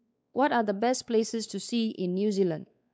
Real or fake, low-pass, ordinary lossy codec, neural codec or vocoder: fake; none; none; codec, 16 kHz, 4 kbps, X-Codec, WavLM features, trained on Multilingual LibriSpeech